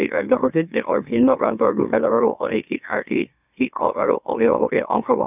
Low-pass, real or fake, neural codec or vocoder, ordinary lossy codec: 3.6 kHz; fake; autoencoder, 44.1 kHz, a latent of 192 numbers a frame, MeloTTS; none